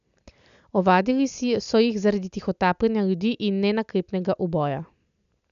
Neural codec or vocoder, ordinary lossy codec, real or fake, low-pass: none; MP3, 96 kbps; real; 7.2 kHz